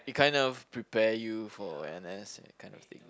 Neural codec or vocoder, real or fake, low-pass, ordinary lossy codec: none; real; none; none